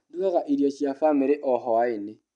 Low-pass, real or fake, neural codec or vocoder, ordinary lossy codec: 10.8 kHz; real; none; none